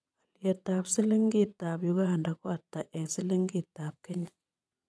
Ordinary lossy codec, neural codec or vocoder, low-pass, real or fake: none; vocoder, 22.05 kHz, 80 mel bands, WaveNeXt; none; fake